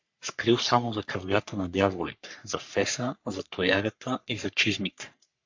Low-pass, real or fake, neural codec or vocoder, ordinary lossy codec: 7.2 kHz; fake; codec, 44.1 kHz, 3.4 kbps, Pupu-Codec; MP3, 64 kbps